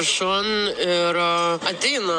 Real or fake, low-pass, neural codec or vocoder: real; 9.9 kHz; none